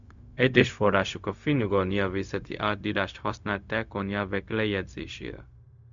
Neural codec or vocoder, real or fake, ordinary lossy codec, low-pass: codec, 16 kHz, 0.4 kbps, LongCat-Audio-Codec; fake; MP3, 64 kbps; 7.2 kHz